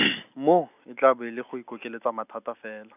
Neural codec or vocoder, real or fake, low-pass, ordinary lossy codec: none; real; 3.6 kHz; none